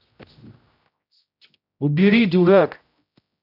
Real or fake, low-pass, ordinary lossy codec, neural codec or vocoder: fake; 5.4 kHz; none; codec, 16 kHz, 0.5 kbps, X-Codec, HuBERT features, trained on general audio